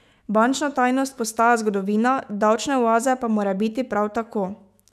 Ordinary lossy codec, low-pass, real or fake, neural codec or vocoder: none; 14.4 kHz; fake; autoencoder, 48 kHz, 128 numbers a frame, DAC-VAE, trained on Japanese speech